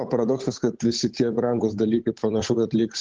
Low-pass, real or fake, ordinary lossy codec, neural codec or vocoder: 7.2 kHz; fake; Opus, 16 kbps; codec, 16 kHz, 8 kbps, FunCodec, trained on Chinese and English, 25 frames a second